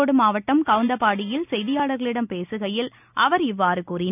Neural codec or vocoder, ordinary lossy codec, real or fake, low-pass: none; none; real; 3.6 kHz